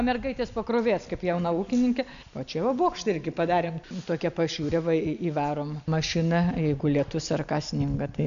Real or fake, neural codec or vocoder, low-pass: real; none; 7.2 kHz